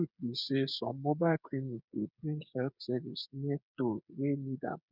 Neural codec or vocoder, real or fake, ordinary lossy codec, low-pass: codec, 16 kHz, 8 kbps, FreqCodec, smaller model; fake; none; 5.4 kHz